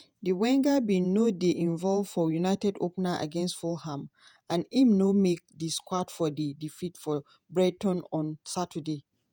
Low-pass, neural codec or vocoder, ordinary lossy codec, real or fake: none; vocoder, 48 kHz, 128 mel bands, Vocos; none; fake